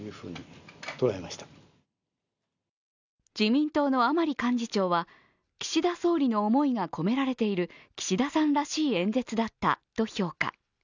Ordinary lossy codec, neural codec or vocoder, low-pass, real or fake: none; none; 7.2 kHz; real